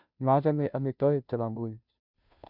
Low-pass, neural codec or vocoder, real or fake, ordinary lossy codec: 5.4 kHz; codec, 16 kHz, 0.5 kbps, FunCodec, trained on Chinese and English, 25 frames a second; fake; none